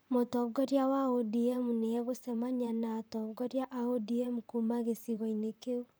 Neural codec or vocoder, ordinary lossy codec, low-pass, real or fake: vocoder, 44.1 kHz, 128 mel bands, Pupu-Vocoder; none; none; fake